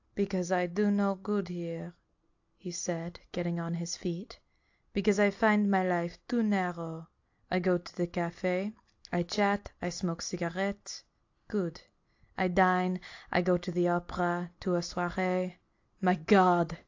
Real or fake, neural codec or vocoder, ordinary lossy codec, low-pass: real; none; AAC, 48 kbps; 7.2 kHz